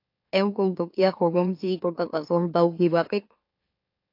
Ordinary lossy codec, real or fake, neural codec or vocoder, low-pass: AAC, 32 kbps; fake; autoencoder, 44.1 kHz, a latent of 192 numbers a frame, MeloTTS; 5.4 kHz